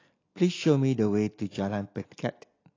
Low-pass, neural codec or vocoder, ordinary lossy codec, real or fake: 7.2 kHz; none; AAC, 32 kbps; real